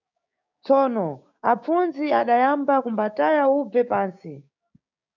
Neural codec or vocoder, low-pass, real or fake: codec, 16 kHz, 6 kbps, DAC; 7.2 kHz; fake